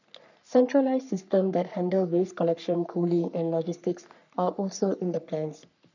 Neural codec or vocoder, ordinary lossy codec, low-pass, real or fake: codec, 44.1 kHz, 3.4 kbps, Pupu-Codec; none; 7.2 kHz; fake